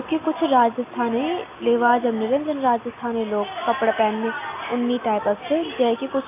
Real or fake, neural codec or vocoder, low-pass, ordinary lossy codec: real; none; 3.6 kHz; AAC, 24 kbps